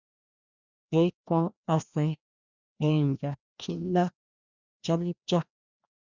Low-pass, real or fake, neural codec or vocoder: 7.2 kHz; fake; codec, 16 kHz, 1 kbps, FreqCodec, larger model